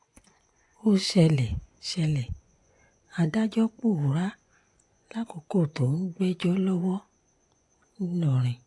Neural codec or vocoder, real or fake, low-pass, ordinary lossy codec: vocoder, 24 kHz, 100 mel bands, Vocos; fake; 10.8 kHz; AAC, 48 kbps